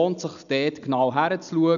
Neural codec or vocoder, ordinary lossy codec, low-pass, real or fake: none; none; 7.2 kHz; real